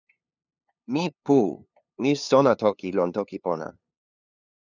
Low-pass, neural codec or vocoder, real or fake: 7.2 kHz; codec, 16 kHz, 2 kbps, FunCodec, trained on LibriTTS, 25 frames a second; fake